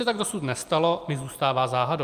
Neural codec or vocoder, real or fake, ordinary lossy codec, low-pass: none; real; Opus, 32 kbps; 14.4 kHz